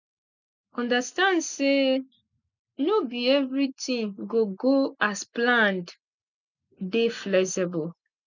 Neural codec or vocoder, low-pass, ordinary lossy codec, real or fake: none; 7.2 kHz; none; real